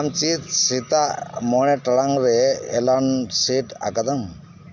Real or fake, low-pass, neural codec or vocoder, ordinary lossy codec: real; 7.2 kHz; none; none